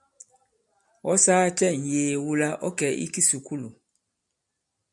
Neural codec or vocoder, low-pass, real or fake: none; 10.8 kHz; real